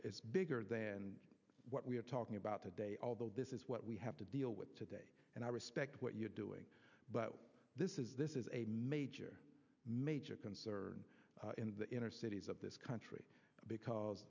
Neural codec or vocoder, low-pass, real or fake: none; 7.2 kHz; real